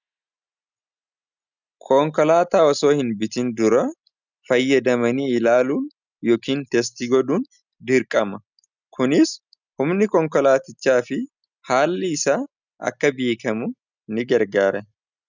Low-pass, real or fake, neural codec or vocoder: 7.2 kHz; real; none